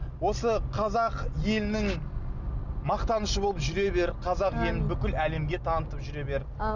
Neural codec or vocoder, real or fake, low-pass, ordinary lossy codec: none; real; 7.2 kHz; none